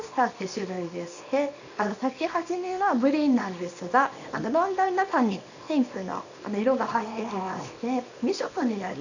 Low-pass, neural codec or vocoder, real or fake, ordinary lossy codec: 7.2 kHz; codec, 24 kHz, 0.9 kbps, WavTokenizer, small release; fake; none